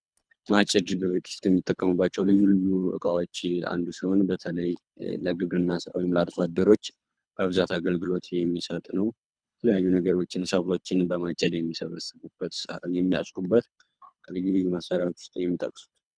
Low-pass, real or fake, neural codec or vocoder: 9.9 kHz; fake; codec, 24 kHz, 3 kbps, HILCodec